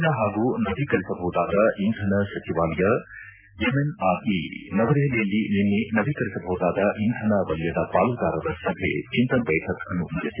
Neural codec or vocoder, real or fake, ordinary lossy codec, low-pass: none; real; none; 3.6 kHz